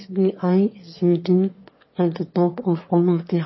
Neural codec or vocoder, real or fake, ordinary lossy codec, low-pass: autoencoder, 22.05 kHz, a latent of 192 numbers a frame, VITS, trained on one speaker; fake; MP3, 24 kbps; 7.2 kHz